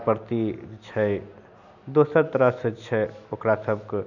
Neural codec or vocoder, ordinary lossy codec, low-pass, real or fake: none; none; 7.2 kHz; real